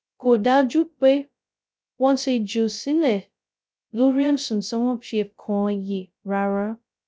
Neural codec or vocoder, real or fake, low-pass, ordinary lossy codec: codec, 16 kHz, 0.2 kbps, FocalCodec; fake; none; none